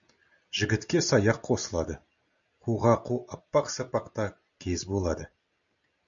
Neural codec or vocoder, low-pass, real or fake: none; 7.2 kHz; real